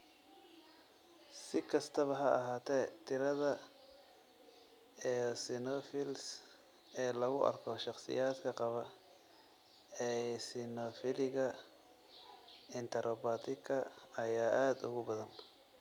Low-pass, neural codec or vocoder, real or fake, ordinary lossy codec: 19.8 kHz; none; real; none